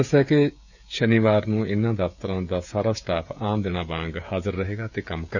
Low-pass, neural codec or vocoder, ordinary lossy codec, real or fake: 7.2 kHz; codec, 16 kHz, 16 kbps, FreqCodec, smaller model; none; fake